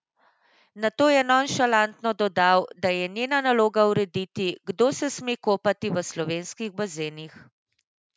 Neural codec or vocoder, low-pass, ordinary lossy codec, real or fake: none; none; none; real